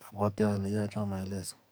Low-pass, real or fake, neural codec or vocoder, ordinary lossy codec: none; fake; codec, 44.1 kHz, 2.6 kbps, SNAC; none